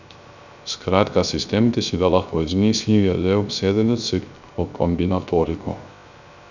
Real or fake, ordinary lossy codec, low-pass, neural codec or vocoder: fake; none; 7.2 kHz; codec, 16 kHz, 0.3 kbps, FocalCodec